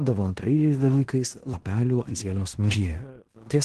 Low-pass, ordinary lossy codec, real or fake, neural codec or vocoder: 10.8 kHz; Opus, 16 kbps; fake; codec, 16 kHz in and 24 kHz out, 0.9 kbps, LongCat-Audio-Codec, fine tuned four codebook decoder